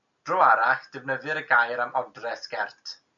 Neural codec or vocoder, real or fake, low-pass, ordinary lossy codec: none; real; 7.2 kHz; MP3, 96 kbps